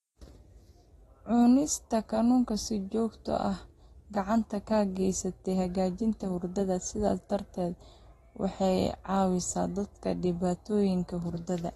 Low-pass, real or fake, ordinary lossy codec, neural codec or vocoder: 14.4 kHz; real; AAC, 32 kbps; none